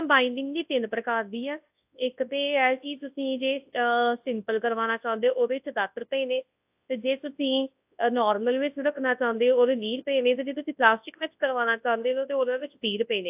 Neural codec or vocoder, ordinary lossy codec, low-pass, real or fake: codec, 24 kHz, 0.9 kbps, WavTokenizer, large speech release; AAC, 32 kbps; 3.6 kHz; fake